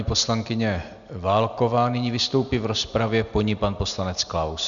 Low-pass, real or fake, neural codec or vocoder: 7.2 kHz; real; none